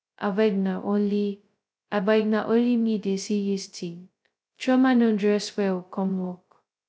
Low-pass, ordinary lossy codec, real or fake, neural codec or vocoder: none; none; fake; codec, 16 kHz, 0.2 kbps, FocalCodec